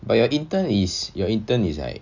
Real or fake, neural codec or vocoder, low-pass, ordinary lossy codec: real; none; 7.2 kHz; none